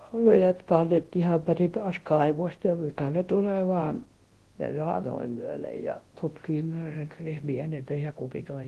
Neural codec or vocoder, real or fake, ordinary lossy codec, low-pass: codec, 24 kHz, 0.9 kbps, WavTokenizer, large speech release; fake; Opus, 16 kbps; 10.8 kHz